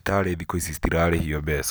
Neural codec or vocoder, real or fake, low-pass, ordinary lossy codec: none; real; none; none